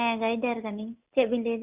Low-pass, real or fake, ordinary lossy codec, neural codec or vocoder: 3.6 kHz; real; Opus, 64 kbps; none